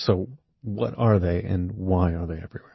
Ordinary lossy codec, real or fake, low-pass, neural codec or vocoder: MP3, 24 kbps; real; 7.2 kHz; none